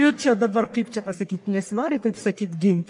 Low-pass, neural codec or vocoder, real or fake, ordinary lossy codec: 10.8 kHz; codec, 44.1 kHz, 1.7 kbps, Pupu-Codec; fake; MP3, 64 kbps